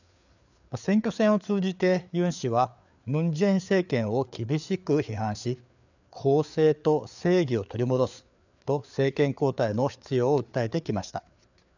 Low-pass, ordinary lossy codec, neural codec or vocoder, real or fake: 7.2 kHz; none; codec, 16 kHz, 4 kbps, FreqCodec, larger model; fake